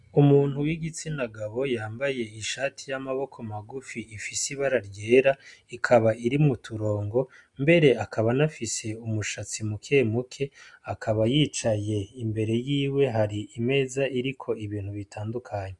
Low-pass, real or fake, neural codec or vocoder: 10.8 kHz; real; none